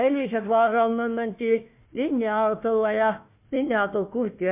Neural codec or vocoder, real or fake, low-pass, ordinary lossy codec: codec, 16 kHz, 1 kbps, FunCodec, trained on Chinese and English, 50 frames a second; fake; 3.6 kHz; MP3, 32 kbps